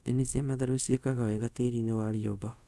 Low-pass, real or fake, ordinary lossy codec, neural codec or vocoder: none; fake; none; codec, 24 kHz, 0.5 kbps, DualCodec